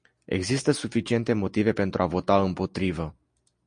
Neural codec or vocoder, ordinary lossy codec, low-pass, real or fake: none; MP3, 48 kbps; 9.9 kHz; real